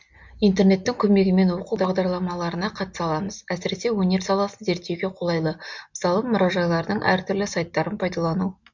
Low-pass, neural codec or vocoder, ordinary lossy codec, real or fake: 7.2 kHz; none; MP3, 64 kbps; real